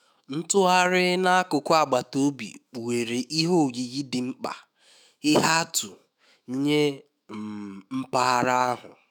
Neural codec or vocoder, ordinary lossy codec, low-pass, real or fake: autoencoder, 48 kHz, 128 numbers a frame, DAC-VAE, trained on Japanese speech; none; none; fake